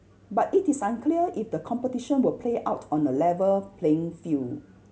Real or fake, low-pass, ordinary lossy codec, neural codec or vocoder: real; none; none; none